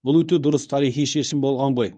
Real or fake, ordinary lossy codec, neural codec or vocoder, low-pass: fake; none; codec, 24 kHz, 0.9 kbps, WavTokenizer, medium speech release version 1; 9.9 kHz